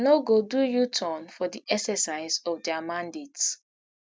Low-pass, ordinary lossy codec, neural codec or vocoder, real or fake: none; none; none; real